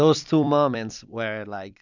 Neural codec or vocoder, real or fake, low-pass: vocoder, 44.1 kHz, 128 mel bands every 256 samples, BigVGAN v2; fake; 7.2 kHz